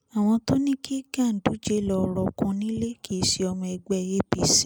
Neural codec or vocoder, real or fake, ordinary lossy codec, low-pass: none; real; none; none